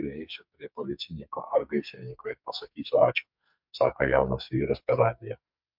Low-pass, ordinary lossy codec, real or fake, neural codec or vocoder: 5.4 kHz; MP3, 48 kbps; fake; codec, 44.1 kHz, 2.6 kbps, SNAC